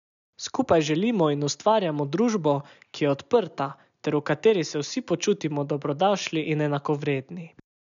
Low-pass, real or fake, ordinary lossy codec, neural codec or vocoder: 7.2 kHz; real; none; none